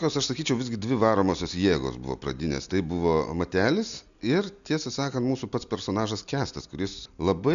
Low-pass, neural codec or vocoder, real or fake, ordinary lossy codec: 7.2 kHz; none; real; MP3, 96 kbps